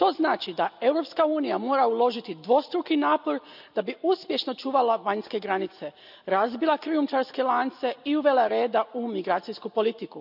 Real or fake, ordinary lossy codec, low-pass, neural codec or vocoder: real; none; 5.4 kHz; none